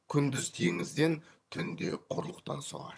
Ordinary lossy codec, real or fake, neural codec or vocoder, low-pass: none; fake; vocoder, 22.05 kHz, 80 mel bands, HiFi-GAN; none